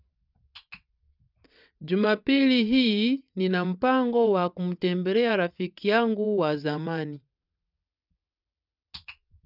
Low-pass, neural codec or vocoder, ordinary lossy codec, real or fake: 5.4 kHz; vocoder, 22.05 kHz, 80 mel bands, WaveNeXt; none; fake